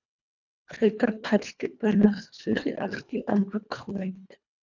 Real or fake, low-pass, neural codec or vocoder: fake; 7.2 kHz; codec, 24 kHz, 1.5 kbps, HILCodec